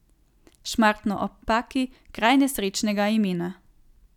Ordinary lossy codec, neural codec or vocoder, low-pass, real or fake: none; none; 19.8 kHz; real